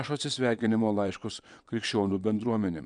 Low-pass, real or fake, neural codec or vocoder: 9.9 kHz; fake; vocoder, 22.05 kHz, 80 mel bands, WaveNeXt